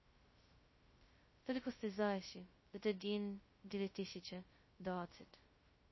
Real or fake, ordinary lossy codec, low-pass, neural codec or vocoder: fake; MP3, 24 kbps; 7.2 kHz; codec, 16 kHz, 0.2 kbps, FocalCodec